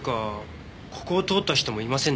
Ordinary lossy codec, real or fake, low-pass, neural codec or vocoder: none; real; none; none